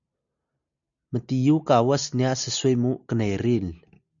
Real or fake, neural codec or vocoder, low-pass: real; none; 7.2 kHz